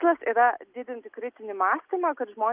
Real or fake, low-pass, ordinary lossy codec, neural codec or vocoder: real; 3.6 kHz; Opus, 24 kbps; none